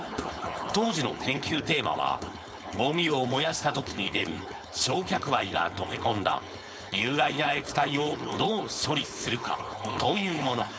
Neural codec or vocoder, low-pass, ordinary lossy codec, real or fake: codec, 16 kHz, 4.8 kbps, FACodec; none; none; fake